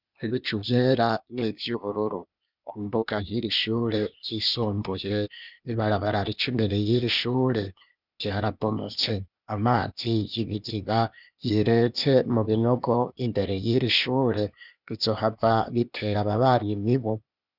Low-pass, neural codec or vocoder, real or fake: 5.4 kHz; codec, 16 kHz, 0.8 kbps, ZipCodec; fake